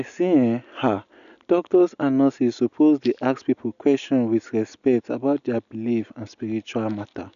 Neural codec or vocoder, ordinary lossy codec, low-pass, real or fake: none; none; 7.2 kHz; real